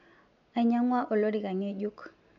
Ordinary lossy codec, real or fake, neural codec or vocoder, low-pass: none; real; none; 7.2 kHz